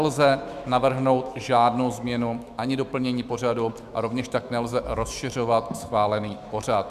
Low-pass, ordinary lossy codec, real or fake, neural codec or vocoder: 14.4 kHz; Opus, 64 kbps; fake; autoencoder, 48 kHz, 128 numbers a frame, DAC-VAE, trained on Japanese speech